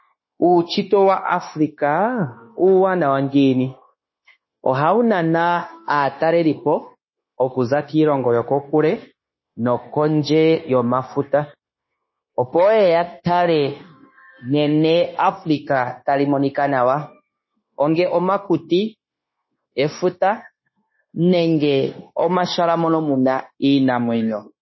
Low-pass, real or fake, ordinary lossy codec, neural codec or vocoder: 7.2 kHz; fake; MP3, 24 kbps; codec, 16 kHz, 0.9 kbps, LongCat-Audio-Codec